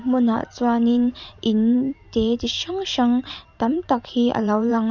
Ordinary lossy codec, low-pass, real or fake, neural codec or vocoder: none; 7.2 kHz; fake; vocoder, 44.1 kHz, 128 mel bands every 512 samples, BigVGAN v2